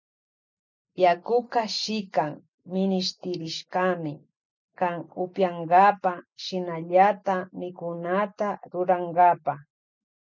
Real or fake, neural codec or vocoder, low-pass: real; none; 7.2 kHz